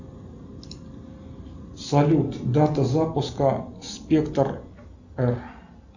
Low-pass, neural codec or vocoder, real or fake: 7.2 kHz; none; real